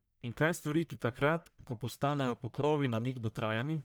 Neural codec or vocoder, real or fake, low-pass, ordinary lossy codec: codec, 44.1 kHz, 1.7 kbps, Pupu-Codec; fake; none; none